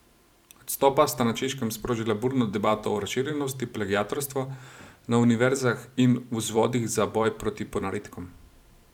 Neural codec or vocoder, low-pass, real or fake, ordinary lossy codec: vocoder, 44.1 kHz, 128 mel bands every 512 samples, BigVGAN v2; 19.8 kHz; fake; none